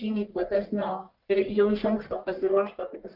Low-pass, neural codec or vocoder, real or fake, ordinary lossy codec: 5.4 kHz; codec, 44.1 kHz, 1.7 kbps, Pupu-Codec; fake; Opus, 32 kbps